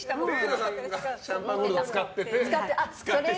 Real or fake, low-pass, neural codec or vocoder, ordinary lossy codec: real; none; none; none